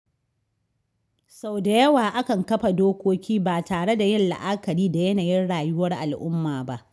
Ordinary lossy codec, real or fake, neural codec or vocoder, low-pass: none; real; none; none